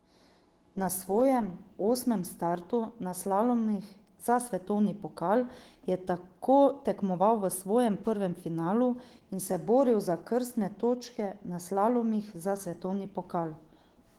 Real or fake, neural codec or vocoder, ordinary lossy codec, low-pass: fake; autoencoder, 48 kHz, 128 numbers a frame, DAC-VAE, trained on Japanese speech; Opus, 16 kbps; 19.8 kHz